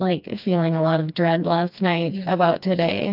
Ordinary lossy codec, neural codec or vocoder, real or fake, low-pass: AAC, 48 kbps; codec, 16 kHz, 2 kbps, FreqCodec, smaller model; fake; 5.4 kHz